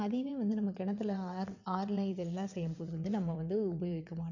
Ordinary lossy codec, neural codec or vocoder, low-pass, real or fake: none; vocoder, 22.05 kHz, 80 mel bands, WaveNeXt; 7.2 kHz; fake